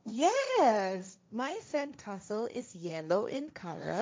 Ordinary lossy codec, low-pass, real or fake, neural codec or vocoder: none; none; fake; codec, 16 kHz, 1.1 kbps, Voila-Tokenizer